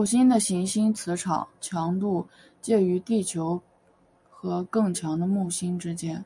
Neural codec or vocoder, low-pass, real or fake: none; 10.8 kHz; real